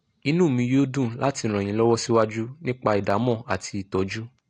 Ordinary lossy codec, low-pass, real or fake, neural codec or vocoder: AAC, 48 kbps; 9.9 kHz; real; none